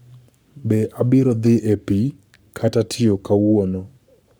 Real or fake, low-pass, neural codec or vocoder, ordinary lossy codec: fake; none; codec, 44.1 kHz, 7.8 kbps, Pupu-Codec; none